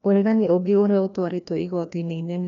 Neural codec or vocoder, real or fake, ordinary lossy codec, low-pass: codec, 16 kHz, 1 kbps, FreqCodec, larger model; fake; none; 7.2 kHz